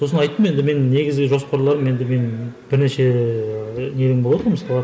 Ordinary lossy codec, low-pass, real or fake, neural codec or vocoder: none; none; real; none